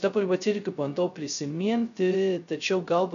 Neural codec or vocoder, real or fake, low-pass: codec, 16 kHz, 0.2 kbps, FocalCodec; fake; 7.2 kHz